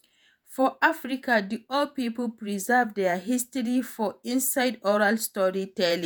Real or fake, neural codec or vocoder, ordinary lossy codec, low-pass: fake; vocoder, 48 kHz, 128 mel bands, Vocos; none; none